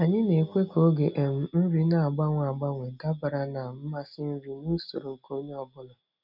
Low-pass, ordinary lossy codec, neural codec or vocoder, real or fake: 5.4 kHz; none; none; real